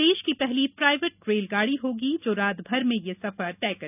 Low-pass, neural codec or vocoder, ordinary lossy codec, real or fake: 3.6 kHz; none; none; real